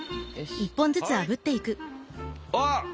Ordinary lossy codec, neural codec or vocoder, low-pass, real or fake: none; none; none; real